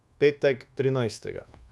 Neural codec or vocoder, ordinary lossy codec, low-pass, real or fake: codec, 24 kHz, 1.2 kbps, DualCodec; none; none; fake